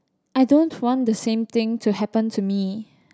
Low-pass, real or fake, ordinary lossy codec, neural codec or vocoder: none; real; none; none